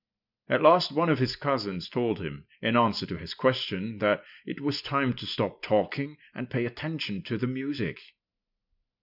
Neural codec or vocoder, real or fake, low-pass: none; real; 5.4 kHz